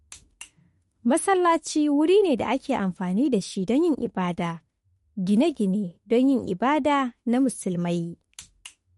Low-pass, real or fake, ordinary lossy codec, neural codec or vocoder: 19.8 kHz; fake; MP3, 48 kbps; autoencoder, 48 kHz, 32 numbers a frame, DAC-VAE, trained on Japanese speech